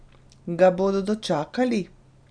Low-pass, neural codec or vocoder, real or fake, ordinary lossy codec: 9.9 kHz; none; real; none